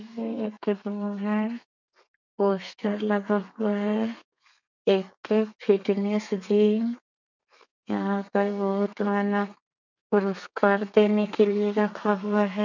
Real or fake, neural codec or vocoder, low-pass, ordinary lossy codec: fake; codec, 32 kHz, 1.9 kbps, SNAC; 7.2 kHz; none